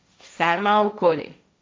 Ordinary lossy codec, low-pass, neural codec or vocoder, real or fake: none; none; codec, 16 kHz, 1.1 kbps, Voila-Tokenizer; fake